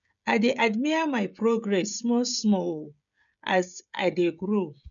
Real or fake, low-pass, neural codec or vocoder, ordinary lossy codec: fake; 7.2 kHz; codec, 16 kHz, 16 kbps, FreqCodec, smaller model; none